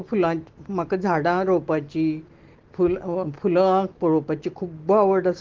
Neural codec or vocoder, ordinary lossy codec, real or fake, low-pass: none; Opus, 16 kbps; real; 7.2 kHz